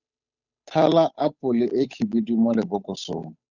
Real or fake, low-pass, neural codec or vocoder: fake; 7.2 kHz; codec, 16 kHz, 8 kbps, FunCodec, trained on Chinese and English, 25 frames a second